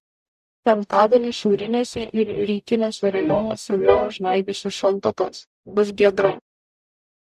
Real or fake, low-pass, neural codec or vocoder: fake; 14.4 kHz; codec, 44.1 kHz, 0.9 kbps, DAC